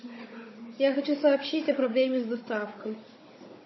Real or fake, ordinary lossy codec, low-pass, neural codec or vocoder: fake; MP3, 24 kbps; 7.2 kHz; codec, 16 kHz, 16 kbps, FunCodec, trained on Chinese and English, 50 frames a second